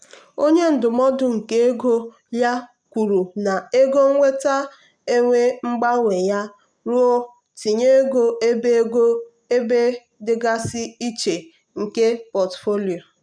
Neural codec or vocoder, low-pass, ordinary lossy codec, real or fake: none; 9.9 kHz; none; real